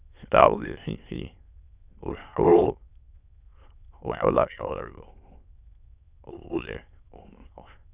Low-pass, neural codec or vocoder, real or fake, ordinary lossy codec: 3.6 kHz; autoencoder, 22.05 kHz, a latent of 192 numbers a frame, VITS, trained on many speakers; fake; Opus, 64 kbps